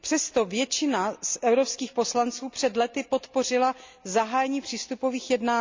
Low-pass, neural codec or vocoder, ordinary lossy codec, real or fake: 7.2 kHz; none; MP3, 64 kbps; real